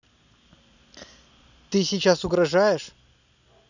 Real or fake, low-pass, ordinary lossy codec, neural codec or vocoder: real; 7.2 kHz; none; none